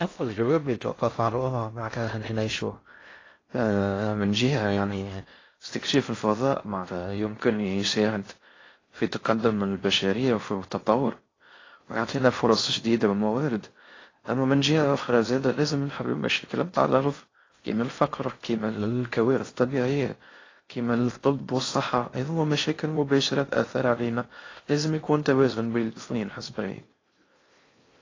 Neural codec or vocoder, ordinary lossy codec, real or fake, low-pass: codec, 16 kHz in and 24 kHz out, 0.6 kbps, FocalCodec, streaming, 4096 codes; AAC, 32 kbps; fake; 7.2 kHz